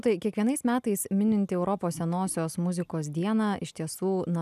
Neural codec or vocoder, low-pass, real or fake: none; 14.4 kHz; real